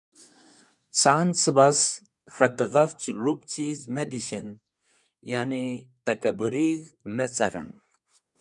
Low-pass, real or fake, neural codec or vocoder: 10.8 kHz; fake; codec, 24 kHz, 1 kbps, SNAC